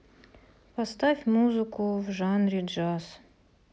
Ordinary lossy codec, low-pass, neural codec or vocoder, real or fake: none; none; none; real